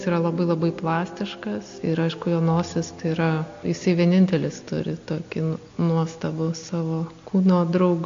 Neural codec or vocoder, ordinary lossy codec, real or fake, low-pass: none; AAC, 48 kbps; real; 7.2 kHz